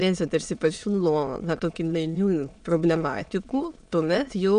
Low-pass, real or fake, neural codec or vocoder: 9.9 kHz; fake; autoencoder, 22.05 kHz, a latent of 192 numbers a frame, VITS, trained on many speakers